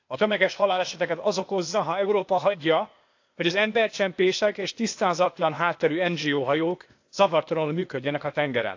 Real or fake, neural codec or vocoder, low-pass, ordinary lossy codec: fake; codec, 16 kHz, 0.8 kbps, ZipCodec; 7.2 kHz; AAC, 48 kbps